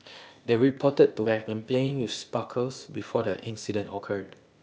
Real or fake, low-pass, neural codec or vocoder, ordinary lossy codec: fake; none; codec, 16 kHz, 0.8 kbps, ZipCodec; none